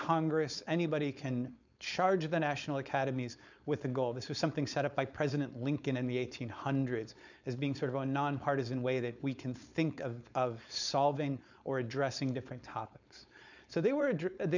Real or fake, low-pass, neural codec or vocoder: fake; 7.2 kHz; codec, 16 kHz, 4.8 kbps, FACodec